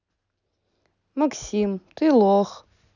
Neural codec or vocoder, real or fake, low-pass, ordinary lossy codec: none; real; 7.2 kHz; none